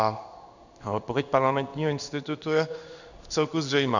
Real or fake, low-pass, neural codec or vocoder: fake; 7.2 kHz; codec, 16 kHz in and 24 kHz out, 1 kbps, XY-Tokenizer